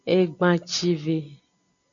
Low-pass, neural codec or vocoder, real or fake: 7.2 kHz; none; real